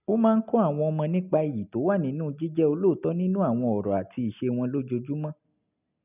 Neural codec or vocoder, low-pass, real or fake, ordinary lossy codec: none; 3.6 kHz; real; none